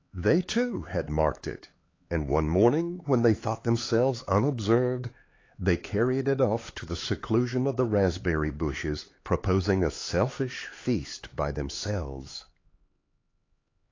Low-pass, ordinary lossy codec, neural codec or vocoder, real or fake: 7.2 kHz; AAC, 32 kbps; codec, 16 kHz, 4 kbps, X-Codec, HuBERT features, trained on LibriSpeech; fake